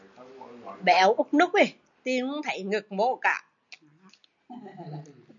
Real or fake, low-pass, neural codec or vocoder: real; 7.2 kHz; none